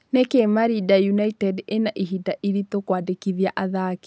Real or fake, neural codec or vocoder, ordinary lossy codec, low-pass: real; none; none; none